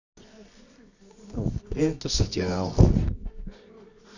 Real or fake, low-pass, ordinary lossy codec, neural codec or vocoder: fake; 7.2 kHz; none; codec, 24 kHz, 0.9 kbps, WavTokenizer, medium music audio release